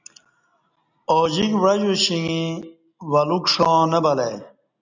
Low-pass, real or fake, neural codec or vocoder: 7.2 kHz; real; none